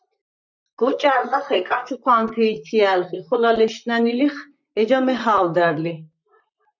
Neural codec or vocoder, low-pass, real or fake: vocoder, 44.1 kHz, 128 mel bands, Pupu-Vocoder; 7.2 kHz; fake